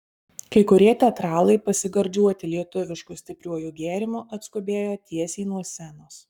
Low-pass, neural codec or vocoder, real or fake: 19.8 kHz; codec, 44.1 kHz, 7.8 kbps, Pupu-Codec; fake